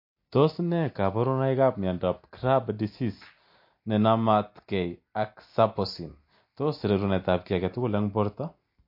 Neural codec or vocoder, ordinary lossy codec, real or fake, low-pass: none; MP3, 32 kbps; real; 5.4 kHz